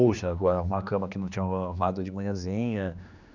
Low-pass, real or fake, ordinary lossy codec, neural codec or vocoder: 7.2 kHz; fake; none; codec, 16 kHz, 2 kbps, X-Codec, HuBERT features, trained on general audio